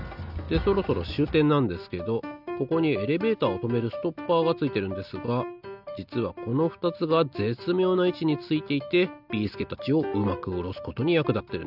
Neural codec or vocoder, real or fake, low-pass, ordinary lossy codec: none; real; 5.4 kHz; none